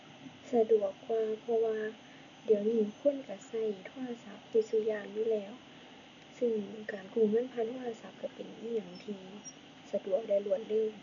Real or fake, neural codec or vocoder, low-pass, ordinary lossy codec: real; none; 7.2 kHz; none